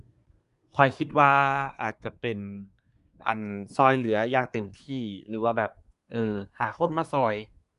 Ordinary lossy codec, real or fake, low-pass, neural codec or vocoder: none; fake; 10.8 kHz; codec, 24 kHz, 1 kbps, SNAC